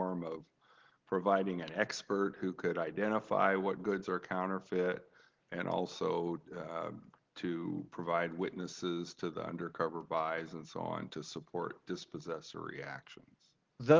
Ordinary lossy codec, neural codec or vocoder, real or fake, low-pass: Opus, 16 kbps; none; real; 7.2 kHz